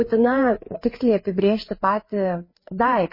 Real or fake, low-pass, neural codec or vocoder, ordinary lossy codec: fake; 5.4 kHz; codec, 16 kHz, 4 kbps, FreqCodec, larger model; MP3, 24 kbps